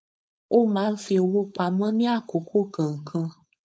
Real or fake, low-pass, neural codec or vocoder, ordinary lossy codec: fake; none; codec, 16 kHz, 4.8 kbps, FACodec; none